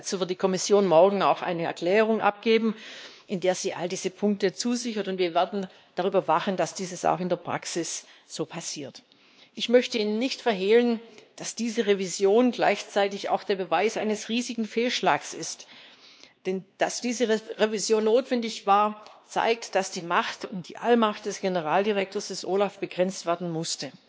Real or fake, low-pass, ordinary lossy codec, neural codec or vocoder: fake; none; none; codec, 16 kHz, 2 kbps, X-Codec, WavLM features, trained on Multilingual LibriSpeech